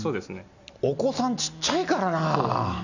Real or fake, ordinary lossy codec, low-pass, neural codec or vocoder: real; none; 7.2 kHz; none